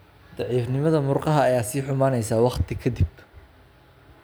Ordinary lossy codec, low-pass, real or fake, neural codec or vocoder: none; none; real; none